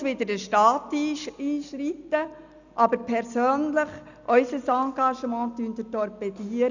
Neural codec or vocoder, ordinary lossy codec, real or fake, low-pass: none; none; real; 7.2 kHz